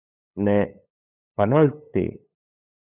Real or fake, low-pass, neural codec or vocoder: fake; 3.6 kHz; codec, 16 kHz, 8 kbps, FunCodec, trained on LibriTTS, 25 frames a second